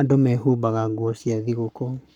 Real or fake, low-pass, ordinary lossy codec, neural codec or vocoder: fake; 19.8 kHz; none; codec, 44.1 kHz, 7.8 kbps, Pupu-Codec